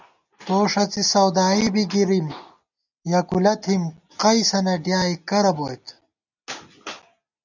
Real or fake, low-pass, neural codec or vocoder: real; 7.2 kHz; none